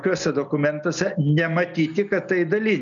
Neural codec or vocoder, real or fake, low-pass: none; real; 7.2 kHz